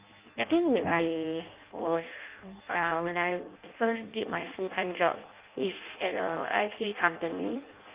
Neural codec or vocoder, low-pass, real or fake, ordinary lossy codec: codec, 16 kHz in and 24 kHz out, 0.6 kbps, FireRedTTS-2 codec; 3.6 kHz; fake; Opus, 24 kbps